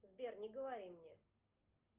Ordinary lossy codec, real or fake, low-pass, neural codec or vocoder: Opus, 32 kbps; real; 3.6 kHz; none